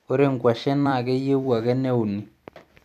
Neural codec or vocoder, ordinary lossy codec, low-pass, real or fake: vocoder, 44.1 kHz, 128 mel bands every 256 samples, BigVGAN v2; none; 14.4 kHz; fake